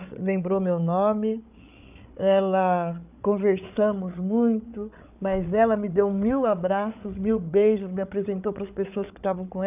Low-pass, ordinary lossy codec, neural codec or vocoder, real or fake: 3.6 kHz; none; codec, 16 kHz, 8 kbps, FreqCodec, larger model; fake